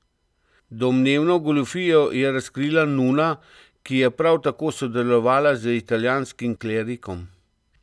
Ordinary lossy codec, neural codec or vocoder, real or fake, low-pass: none; none; real; none